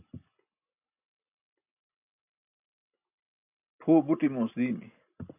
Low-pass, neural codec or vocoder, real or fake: 3.6 kHz; none; real